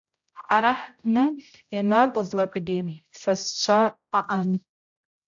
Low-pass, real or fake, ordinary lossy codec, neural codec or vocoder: 7.2 kHz; fake; AAC, 64 kbps; codec, 16 kHz, 0.5 kbps, X-Codec, HuBERT features, trained on general audio